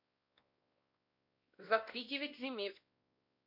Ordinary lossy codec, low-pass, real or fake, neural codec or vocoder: MP3, 32 kbps; 5.4 kHz; fake; codec, 16 kHz, 1 kbps, X-Codec, WavLM features, trained on Multilingual LibriSpeech